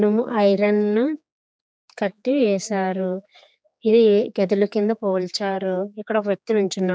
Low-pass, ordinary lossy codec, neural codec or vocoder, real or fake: none; none; codec, 16 kHz, 4 kbps, X-Codec, HuBERT features, trained on general audio; fake